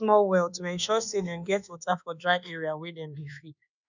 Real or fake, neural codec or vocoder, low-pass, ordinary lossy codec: fake; codec, 24 kHz, 1.2 kbps, DualCodec; 7.2 kHz; none